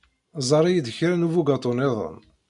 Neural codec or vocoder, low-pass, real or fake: none; 10.8 kHz; real